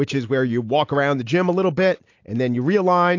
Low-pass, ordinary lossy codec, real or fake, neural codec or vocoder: 7.2 kHz; AAC, 48 kbps; real; none